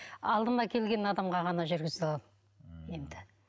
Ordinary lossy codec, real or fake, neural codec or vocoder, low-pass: none; real; none; none